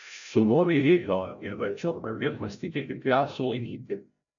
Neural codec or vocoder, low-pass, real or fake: codec, 16 kHz, 0.5 kbps, FreqCodec, larger model; 7.2 kHz; fake